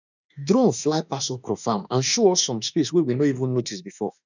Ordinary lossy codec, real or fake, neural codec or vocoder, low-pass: none; fake; autoencoder, 48 kHz, 32 numbers a frame, DAC-VAE, trained on Japanese speech; 7.2 kHz